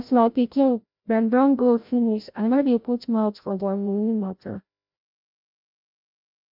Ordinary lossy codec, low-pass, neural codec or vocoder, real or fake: MP3, 48 kbps; 5.4 kHz; codec, 16 kHz, 0.5 kbps, FreqCodec, larger model; fake